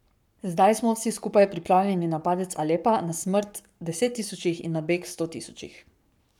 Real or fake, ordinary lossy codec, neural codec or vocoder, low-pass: fake; none; codec, 44.1 kHz, 7.8 kbps, Pupu-Codec; 19.8 kHz